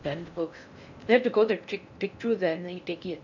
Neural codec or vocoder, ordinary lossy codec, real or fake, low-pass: codec, 16 kHz in and 24 kHz out, 0.6 kbps, FocalCodec, streaming, 4096 codes; none; fake; 7.2 kHz